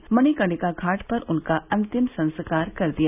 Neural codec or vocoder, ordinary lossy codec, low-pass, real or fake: none; none; 3.6 kHz; real